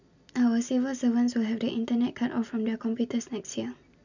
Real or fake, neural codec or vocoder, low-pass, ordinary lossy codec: real; none; 7.2 kHz; none